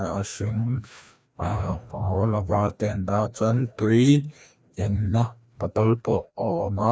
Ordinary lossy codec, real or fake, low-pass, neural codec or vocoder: none; fake; none; codec, 16 kHz, 1 kbps, FreqCodec, larger model